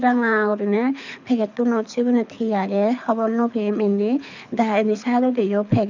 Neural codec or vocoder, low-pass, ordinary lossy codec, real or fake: codec, 24 kHz, 6 kbps, HILCodec; 7.2 kHz; none; fake